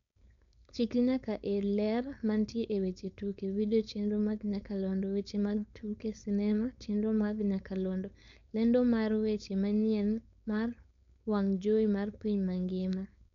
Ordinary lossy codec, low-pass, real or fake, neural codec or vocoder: none; 7.2 kHz; fake; codec, 16 kHz, 4.8 kbps, FACodec